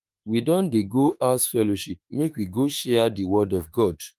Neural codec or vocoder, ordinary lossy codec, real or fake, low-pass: autoencoder, 48 kHz, 32 numbers a frame, DAC-VAE, trained on Japanese speech; Opus, 32 kbps; fake; 14.4 kHz